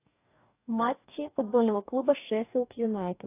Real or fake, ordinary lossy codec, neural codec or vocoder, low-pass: fake; AAC, 24 kbps; codec, 44.1 kHz, 2.6 kbps, DAC; 3.6 kHz